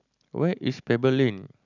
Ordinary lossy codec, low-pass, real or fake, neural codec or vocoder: none; 7.2 kHz; real; none